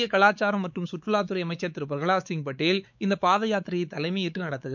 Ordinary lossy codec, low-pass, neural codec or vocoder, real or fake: none; 7.2 kHz; codec, 16 kHz, 4 kbps, X-Codec, WavLM features, trained on Multilingual LibriSpeech; fake